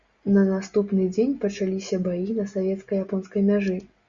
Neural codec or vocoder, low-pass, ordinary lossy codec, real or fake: none; 7.2 kHz; Opus, 64 kbps; real